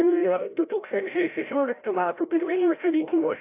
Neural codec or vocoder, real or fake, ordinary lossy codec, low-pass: codec, 16 kHz, 0.5 kbps, FreqCodec, larger model; fake; AAC, 24 kbps; 3.6 kHz